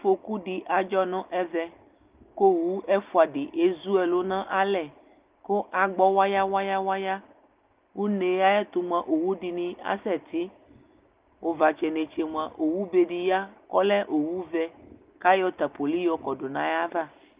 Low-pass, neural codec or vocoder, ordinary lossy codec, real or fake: 3.6 kHz; none; Opus, 24 kbps; real